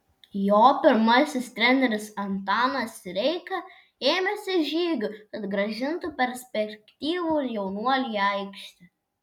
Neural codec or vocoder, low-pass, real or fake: none; 19.8 kHz; real